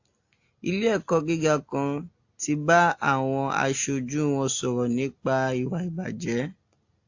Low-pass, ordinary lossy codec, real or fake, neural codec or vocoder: 7.2 kHz; AAC, 48 kbps; real; none